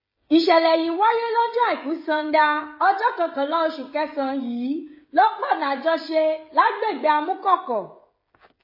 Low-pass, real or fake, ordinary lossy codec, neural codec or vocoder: 5.4 kHz; fake; MP3, 24 kbps; codec, 16 kHz, 16 kbps, FreqCodec, smaller model